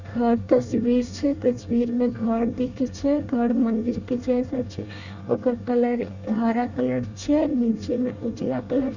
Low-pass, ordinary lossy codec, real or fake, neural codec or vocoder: 7.2 kHz; none; fake; codec, 24 kHz, 1 kbps, SNAC